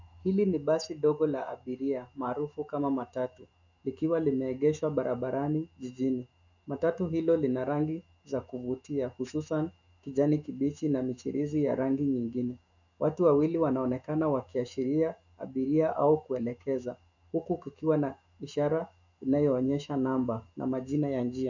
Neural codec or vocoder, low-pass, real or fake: autoencoder, 48 kHz, 128 numbers a frame, DAC-VAE, trained on Japanese speech; 7.2 kHz; fake